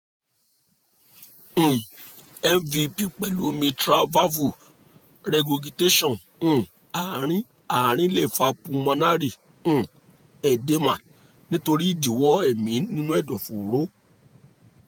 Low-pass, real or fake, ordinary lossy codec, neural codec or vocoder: none; fake; none; vocoder, 48 kHz, 128 mel bands, Vocos